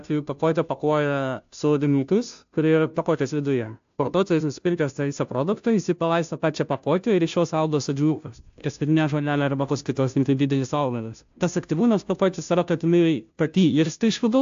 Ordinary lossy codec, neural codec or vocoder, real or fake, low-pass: AAC, 64 kbps; codec, 16 kHz, 0.5 kbps, FunCodec, trained on Chinese and English, 25 frames a second; fake; 7.2 kHz